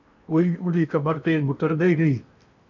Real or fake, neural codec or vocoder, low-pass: fake; codec, 16 kHz in and 24 kHz out, 0.8 kbps, FocalCodec, streaming, 65536 codes; 7.2 kHz